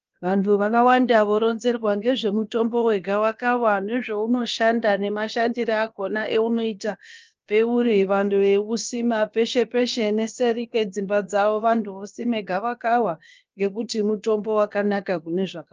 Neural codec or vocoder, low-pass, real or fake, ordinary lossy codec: codec, 16 kHz, about 1 kbps, DyCAST, with the encoder's durations; 7.2 kHz; fake; Opus, 24 kbps